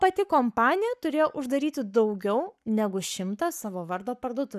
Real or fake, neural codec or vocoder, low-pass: fake; codec, 44.1 kHz, 7.8 kbps, Pupu-Codec; 14.4 kHz